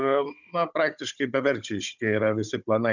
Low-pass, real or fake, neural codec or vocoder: 7.2 kHz; fake; codec, 16 kHz, 16 kbps, FunCodec, trained on Chinese and English, 50 frames a second